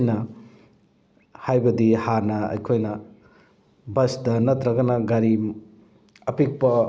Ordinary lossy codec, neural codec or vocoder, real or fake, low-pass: none; none; real; none